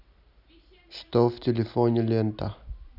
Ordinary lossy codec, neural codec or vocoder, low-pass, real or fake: none; none; 5.4 kHz; real